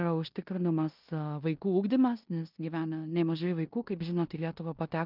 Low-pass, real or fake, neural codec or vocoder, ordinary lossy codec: 5.4 kHz; fake; codec, 16 kHz in and 24 kHz out, 0.9 kbps, LongCat-Audio-Codec, four codebook decoder; Opus, 24 kbps